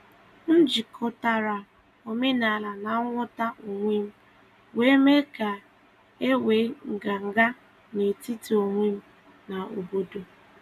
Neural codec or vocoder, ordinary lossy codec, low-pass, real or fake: none; none; 14.4 kHz; real